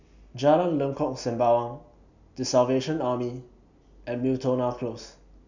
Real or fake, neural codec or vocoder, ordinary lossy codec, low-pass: real; none; none; 7.2 kHz